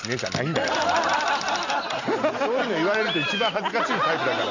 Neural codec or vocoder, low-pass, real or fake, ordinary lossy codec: none; 7.2 kHz; real; none